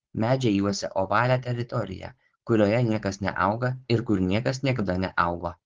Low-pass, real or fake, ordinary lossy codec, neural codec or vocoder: 7.2 kHz; fake; Opus, 16 kbps; codec, 16 kHz, 4.8 kbps, FACodec